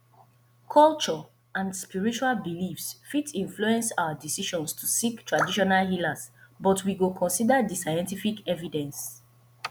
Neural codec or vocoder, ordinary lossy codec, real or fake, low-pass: none; none; real; none